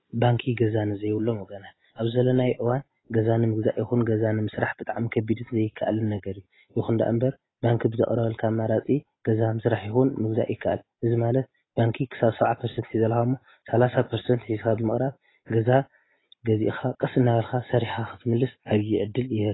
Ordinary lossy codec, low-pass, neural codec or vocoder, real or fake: AAC, 16 kbps; 7.2 kHz; none; real